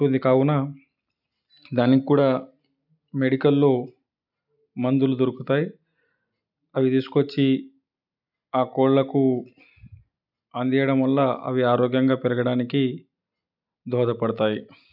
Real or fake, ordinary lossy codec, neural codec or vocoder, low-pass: real; none; none; 5.4 kHz